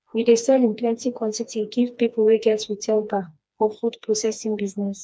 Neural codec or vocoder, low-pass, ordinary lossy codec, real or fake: codec, 16 kHz, 2 kbps, FreqCodec, smaller model; none; none; fake